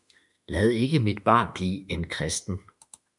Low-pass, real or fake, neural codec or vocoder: 10.8 kHz; fake; autoencoder, 48 kHz, 32 numbers a frame, DAC-VAE, trained on Japanese speech